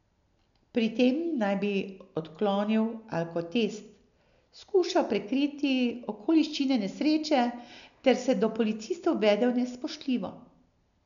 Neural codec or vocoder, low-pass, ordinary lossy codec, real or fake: none; 7.2 kHz; none; real